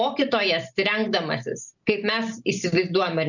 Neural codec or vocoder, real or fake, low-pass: none; real; 7.2 kHz